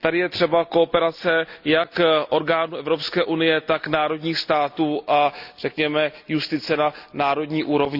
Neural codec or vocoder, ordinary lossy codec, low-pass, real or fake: none; Opus, 64 kbps; 5.4 kHz; real